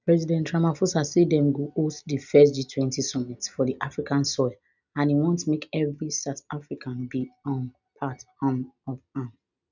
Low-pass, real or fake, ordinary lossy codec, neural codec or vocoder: 7.2 kHz; real; none; none